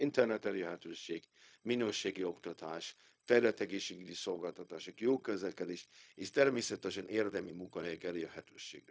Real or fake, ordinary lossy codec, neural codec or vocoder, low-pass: fake; none; codec, 16 kHz, 0.4 kbps, LongCat-Audio-Codec; none